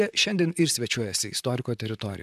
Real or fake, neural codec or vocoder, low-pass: fake; vocoder, 44.1 kHz, 128 mel bands, Pupu-Vocoder; 14.4 kHz